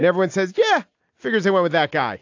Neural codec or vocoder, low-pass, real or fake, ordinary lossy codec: none; 7.2 kHz; real; AAC, 48 kbps